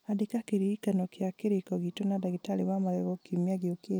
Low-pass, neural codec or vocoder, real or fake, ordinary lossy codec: none; none; real; none